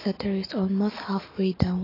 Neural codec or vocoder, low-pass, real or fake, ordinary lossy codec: autoencoder, 48 kHz, 128 numbers a frame, DAC-VAE, trained on Japanese speech; 5.4 kHz; fake; AAC, 24 kbps